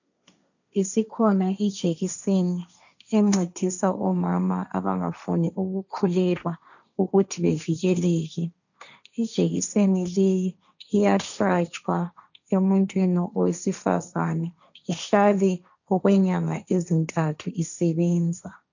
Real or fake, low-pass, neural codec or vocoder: fake; 7.2 kHz; codec, 16 kHz, 1.1 kbps, Voila-Tokenizer